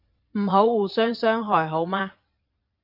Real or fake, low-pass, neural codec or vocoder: fake; 5.4 kHz; vocoder, 22.05 kHz, 80 mel bands, Vocos